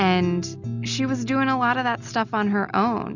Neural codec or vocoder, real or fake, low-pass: none; real; 7.2 kHz